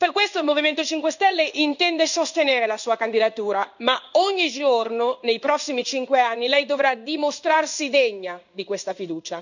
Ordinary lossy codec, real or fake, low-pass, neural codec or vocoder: none; fake; 7.2 kHz; codec, 16 kHz in and 24 kHz out, 1 kbps, XY-Tokenizer